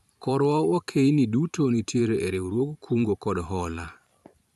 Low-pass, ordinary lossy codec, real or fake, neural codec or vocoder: 14.4 kHz; none; fake; vocoder, 44.1 kHz, 128 mel bands every 512 samples, BigVGAN v2